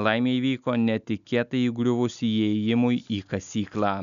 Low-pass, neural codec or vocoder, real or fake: 7.2 kHz; none; real